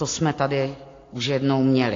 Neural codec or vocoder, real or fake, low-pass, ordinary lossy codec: none; real; 7.2 kHz; AAC, 32 kbps